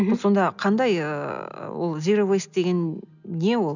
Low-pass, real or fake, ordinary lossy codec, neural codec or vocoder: 7.2 kHz; real; none; none